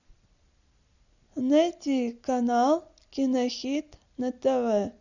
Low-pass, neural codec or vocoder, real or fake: 7.2 kHz; none; real